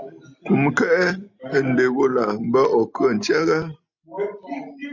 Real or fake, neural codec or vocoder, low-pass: real; none; 7.2 kHz